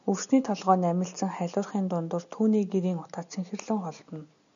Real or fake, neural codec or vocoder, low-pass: real; none; 7.2 kHz